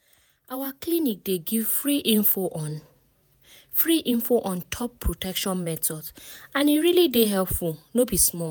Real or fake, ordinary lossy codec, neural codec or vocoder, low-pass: fake; none; vocoder, 48 kHz, 128 mel bands, Vocos; none